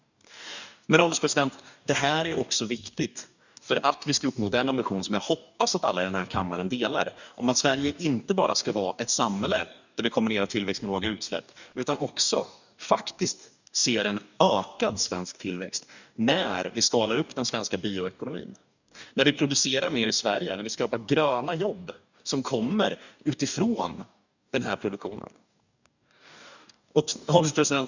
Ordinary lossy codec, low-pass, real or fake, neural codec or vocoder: none; 7.2 kHz; fake; codec, 44.1 kHz, 2.6 kbps, DAC